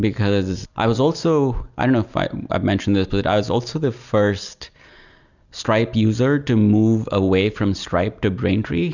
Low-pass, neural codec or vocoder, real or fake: 7.2 kHz; none; real